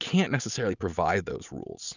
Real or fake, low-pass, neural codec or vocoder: real; 7.2 kHz; none